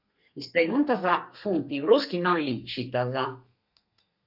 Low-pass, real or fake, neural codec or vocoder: 5.4 kHz; fake; codec, 32 kHz, 1.9 kbps, SNAC